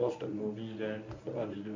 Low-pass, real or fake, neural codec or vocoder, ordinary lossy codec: 7.2 kHz; fake; codec, 24 kHz, 0.9 kbps, WavTokenizer, medium speech release version 1; MP3, 48 kbps